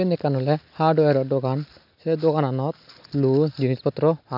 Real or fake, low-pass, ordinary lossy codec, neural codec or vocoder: real; 5.4 kHz; none; none